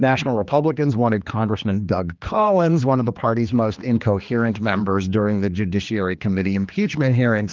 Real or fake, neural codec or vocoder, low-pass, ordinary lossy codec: fake; codec, 16 kHz, 2 kbps, X-Codec, HuBERT features, trained on general audio; 7.2 kHz; Opus, 32 kbps